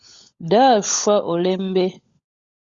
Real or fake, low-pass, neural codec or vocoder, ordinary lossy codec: fake; 7.2 kHz; codec, 16 kHz, 16 kbps, FunCodec, trained on LibriTTS, 50 frames a second; Opus, 64 kbps